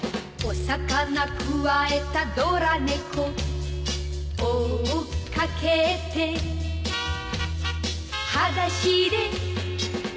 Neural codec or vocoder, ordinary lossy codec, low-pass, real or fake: none; none; none; real